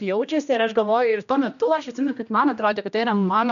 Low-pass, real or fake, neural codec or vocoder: 7.2 kHz; fake; codec, 16 kHz, 1 kbps, X-Codec, HuBERT features, trained on general audio